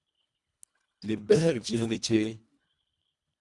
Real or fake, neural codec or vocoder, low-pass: fake; codec, 24 kHz, 1.5 kbps, HILCodec; 10.8 kHz